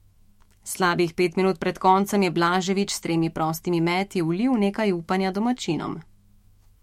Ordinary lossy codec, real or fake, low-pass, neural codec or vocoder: MP3, 64 kbps; fake; 19.8 kHz; autoencoder, 48 kHz, 128 numbers a frame, DAC-VAE, trained on Japanese speech